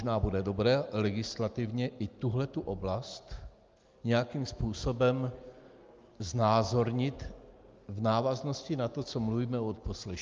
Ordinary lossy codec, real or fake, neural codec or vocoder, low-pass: Opus, 24 kbps; real; none; 7.2 kHz